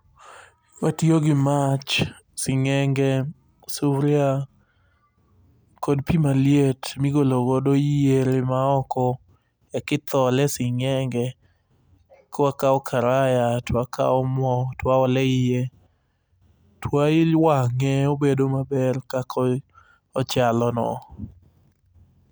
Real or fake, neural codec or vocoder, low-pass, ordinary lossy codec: real; none; none; none